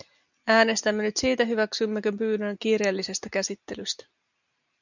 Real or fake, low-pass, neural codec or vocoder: real; 7.2 kHz; none